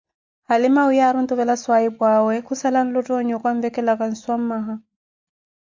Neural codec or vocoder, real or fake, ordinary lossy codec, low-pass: none; real; MP3, 64 kbps; 7.2 kHz